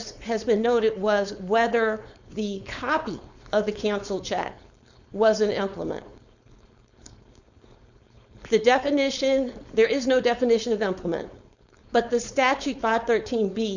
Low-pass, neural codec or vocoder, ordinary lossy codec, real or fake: 7.2 kHz; codec, 16 kHz, 4.8 kbps, FACodec; Opus, 64 kbps; fake